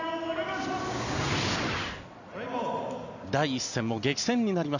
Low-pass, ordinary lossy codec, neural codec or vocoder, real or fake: 7.2 kHz; none; none; real